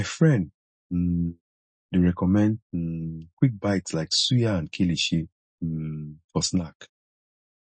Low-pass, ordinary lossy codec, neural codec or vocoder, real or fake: 9.9 kHz; MP3, 32 kbps; none; real